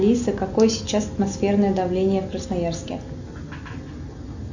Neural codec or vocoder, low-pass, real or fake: none; 7.2 kHz; real